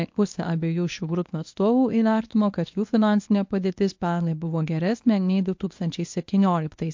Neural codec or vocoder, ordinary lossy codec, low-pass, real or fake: codec, 24 kHz, 0.9 kbps, WavTokenizer, medium speech release version 1; MP3, 48 kbps; 7.2 kHz; fake